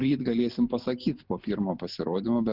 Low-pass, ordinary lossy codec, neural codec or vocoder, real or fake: 5.4 kHz; Opus, 16 kbps; none; real